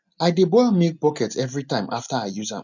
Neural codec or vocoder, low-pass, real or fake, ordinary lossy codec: none; 7.2 kHz; real; none